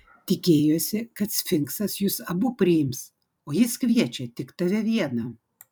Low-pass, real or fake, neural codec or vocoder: 19.8 kHz; fake; vocoder, 44.1 kHz, 128 mel bands every 256 samples, BigVGAN v2